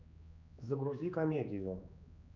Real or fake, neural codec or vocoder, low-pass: fake; codec, 16 kHz, 2 kbps, X-Codec, HuBERT features, trained on general audio; 7.2 kHz